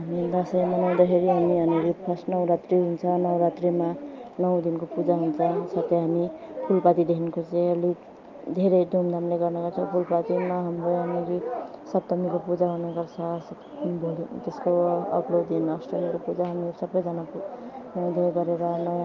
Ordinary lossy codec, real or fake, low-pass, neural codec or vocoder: Opus, 24 kbps; real; 7.2 kHz; none